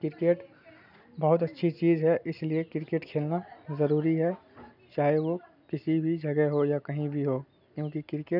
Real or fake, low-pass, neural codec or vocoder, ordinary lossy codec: real; 5.4 kHz; none; none